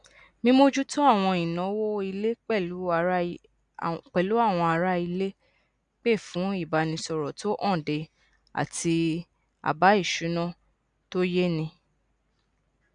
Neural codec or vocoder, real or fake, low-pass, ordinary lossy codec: none; real; 9.9 kHz; none